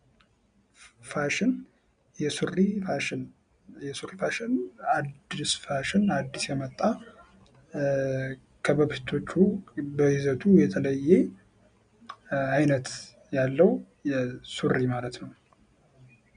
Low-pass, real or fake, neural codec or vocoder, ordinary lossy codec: 9.9 kHz; real; none; MP3, 64 kbps